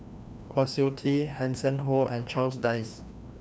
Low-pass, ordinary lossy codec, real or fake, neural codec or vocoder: none; none; fake; codec, 16 kHz, 1 kbps, FreqCodec, larger model